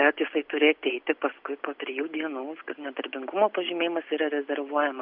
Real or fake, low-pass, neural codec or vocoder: real; 5.4 kHz; none